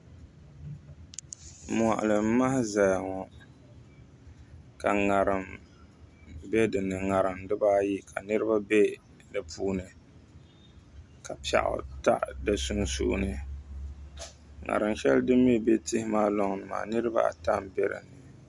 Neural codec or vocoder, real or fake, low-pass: none; real; 10.8 kHz